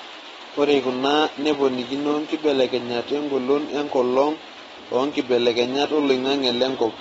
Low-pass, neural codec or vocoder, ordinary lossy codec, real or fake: 14.4 kHz; none; AAC, 24 kbps; real